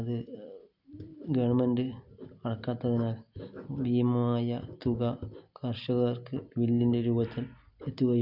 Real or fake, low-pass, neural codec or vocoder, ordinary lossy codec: real; 5.4 kHz; none; none